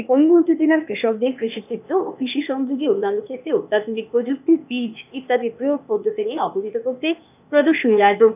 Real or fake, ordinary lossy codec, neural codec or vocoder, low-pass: fake; none; codec, 16 kHz, 0.8 kbps, ZipCodec; 3.6 kHz